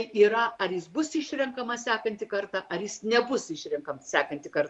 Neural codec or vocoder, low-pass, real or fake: vocoder, 44.1 kHz, 128 mel bands every 512 samples, BigVGAN v2; 10.8 kHz; fake